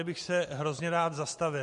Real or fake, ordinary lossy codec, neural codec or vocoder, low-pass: real; MP3, 48 kbps; none; 14.4 kHz